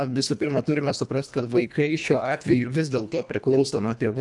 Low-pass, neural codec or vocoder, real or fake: 10.8 kHz; codec, 24 kHz, 1.5 kbps, HILCodec; fake